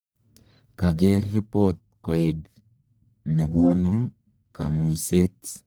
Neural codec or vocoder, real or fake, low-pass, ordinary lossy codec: codec, 44.1 kHz, 1.7 kbps, Pupu-Codec; fake; none; none